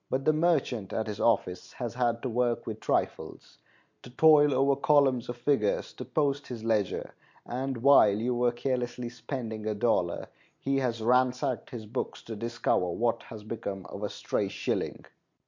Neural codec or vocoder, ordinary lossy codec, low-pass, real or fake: none; MP3, 48 kbps; 7.2 kHz; real